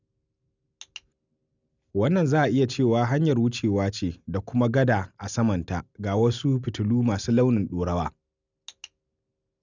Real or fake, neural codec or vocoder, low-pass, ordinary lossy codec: real; none; 7.2 kHz; none